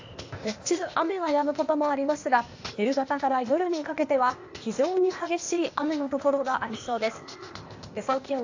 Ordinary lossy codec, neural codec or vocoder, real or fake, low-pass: AAC, 48 kbps; codec, 16 kHz, 0.8 kbps, ZipCodec; fake; 7.2 kHz